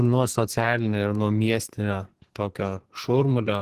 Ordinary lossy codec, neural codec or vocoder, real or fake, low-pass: Opus, 16 kbps; codec, 44.1 kHz, 2.6 kbps, SNAC; fake; 14.4 kHz